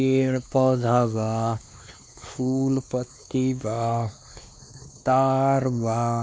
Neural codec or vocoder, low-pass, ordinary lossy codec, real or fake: codec, 16 kHz, 2 kbps, X-Codec, WavLM features, trained on Multilingual LibriSpeech; none; none; fake